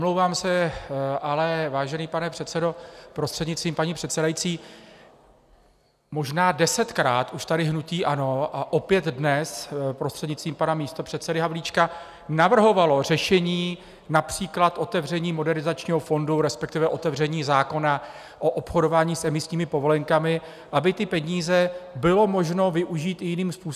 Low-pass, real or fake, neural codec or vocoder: 14.4 kHz; real; none